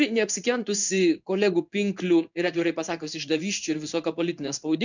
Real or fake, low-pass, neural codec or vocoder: fake; 7.2 kHz; codec, 16 kHz in and 24 kHz out, 1 kbps, XY-Tokenizer